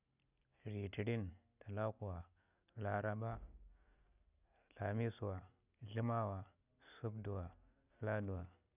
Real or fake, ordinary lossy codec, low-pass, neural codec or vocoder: real; none; 3.6 kHz; none